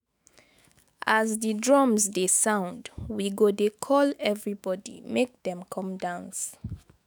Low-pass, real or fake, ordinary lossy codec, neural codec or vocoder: none; fake; none; autoencoder, 48 kHz, 128 numbers a frame, DAC-VAE, trained on Japanese speech